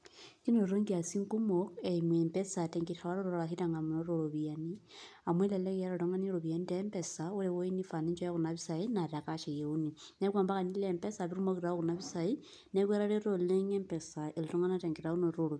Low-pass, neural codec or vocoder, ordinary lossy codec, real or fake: none; none; none; real